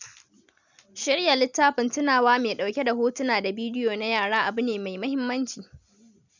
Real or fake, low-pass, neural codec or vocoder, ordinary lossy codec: real; 7.2 kHz; none; none